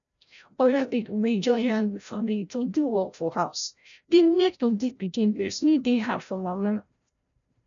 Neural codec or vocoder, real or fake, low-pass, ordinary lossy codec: codec, 16 kHz, 0.5 kbps, FreqCodec, larger model; fake; 7.2 kHz; none